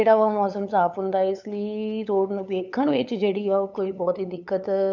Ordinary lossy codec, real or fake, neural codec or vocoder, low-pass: none; fake; codec, 16 kHz, 8 kbps, FunCodec, trained on LibriTTS, 25 frames a second; 7.2 kHz